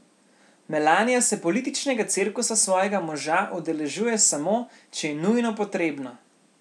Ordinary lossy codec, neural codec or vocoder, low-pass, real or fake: none; none; none; real